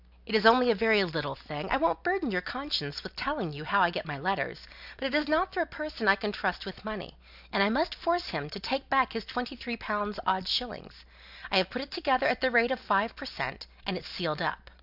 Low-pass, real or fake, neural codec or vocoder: 5.4 kHz; real; none